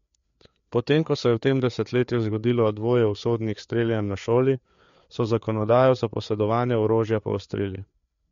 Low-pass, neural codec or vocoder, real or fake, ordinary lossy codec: 7.2 kHz; codec, 16 kHz, 4 kbps, FreqCodec, larger model; fake; MP3, 48 kbps